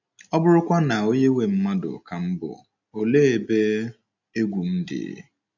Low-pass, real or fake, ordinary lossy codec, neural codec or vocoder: 7.2 kHz; real; none; none